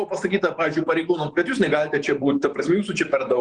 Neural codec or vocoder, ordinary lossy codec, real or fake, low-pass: vocoder, 48 kHz, 128 mel bands, Vocos; Opus, 24 kbps; fake; 10.8 kHz